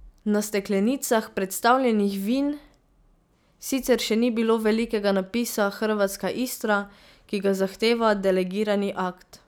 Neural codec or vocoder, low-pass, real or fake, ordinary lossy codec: none; none; real; none